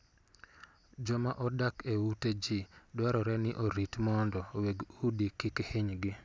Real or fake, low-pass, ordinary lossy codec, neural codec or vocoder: real; none; none; none